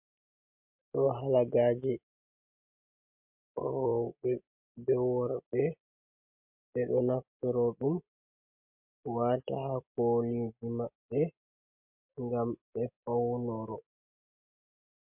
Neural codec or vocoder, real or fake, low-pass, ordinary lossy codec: none; real; 3.6 kHz; MP3, 32 kbps